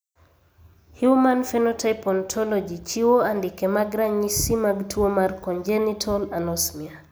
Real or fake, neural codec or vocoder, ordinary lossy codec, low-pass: real; none; none; none